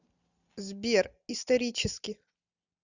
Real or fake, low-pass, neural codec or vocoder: real; 7.2 kHz; none